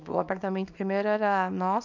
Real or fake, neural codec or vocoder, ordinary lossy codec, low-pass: fake; codec, 24 kHz, 0.9 kbps, WavTokenizer, small release; none; 7.2 kHz